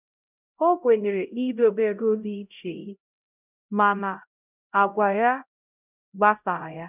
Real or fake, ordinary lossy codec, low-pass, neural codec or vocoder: fake; none; 3.6 kHz; codec, 16 kHz, 0.5 kbps, X-Codec, HuBERT features, trained on LibriSpeech